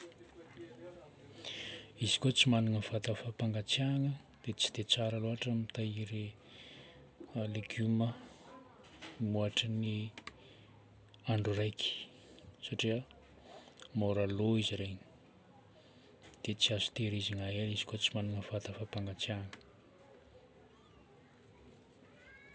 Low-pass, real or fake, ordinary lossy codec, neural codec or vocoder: none; real; none; none